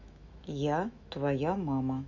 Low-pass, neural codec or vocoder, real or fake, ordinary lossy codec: 7.2 kHz; autoencoder, 48 kHz, 128 numbers a frame, DAC-VAE, trained on Japanese speech; fake; none